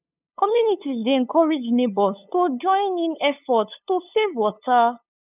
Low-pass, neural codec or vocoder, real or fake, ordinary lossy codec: 3.6 kHz; codec, 16 kHz, 8 kbps, FunCodec, trained on LibriTTS, 25 frames a second; fake; none